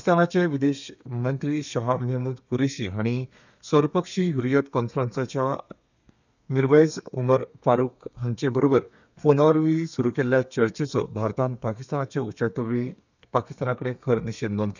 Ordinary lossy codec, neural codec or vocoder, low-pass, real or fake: none; codec, 32 kHz, 1.9 kbps, SNAC; 7.2 kHz; fake